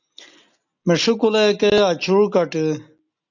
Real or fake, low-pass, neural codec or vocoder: real; 7.2 kHz; none